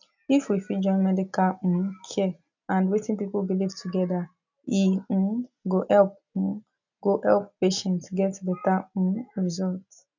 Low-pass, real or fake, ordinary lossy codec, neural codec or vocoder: 7.2 kHz; real; none; none